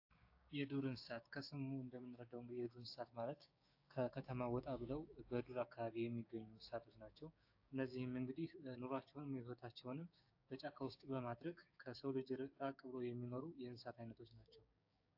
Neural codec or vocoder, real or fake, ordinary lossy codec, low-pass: codec, 16 kHz, 6 kbps, DAC; fake; AAC, 48 kbps; 5.4 kHz